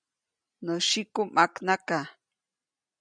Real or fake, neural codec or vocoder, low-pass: real; none; 9.9 kHz